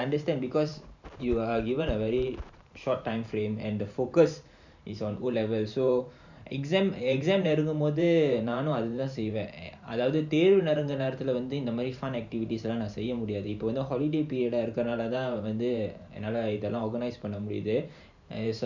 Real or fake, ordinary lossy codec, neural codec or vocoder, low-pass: fake; none; vocoder, 44.1 kHz, 128 mel bands every 512 samples, BigVGAN v2; 7.2 kHz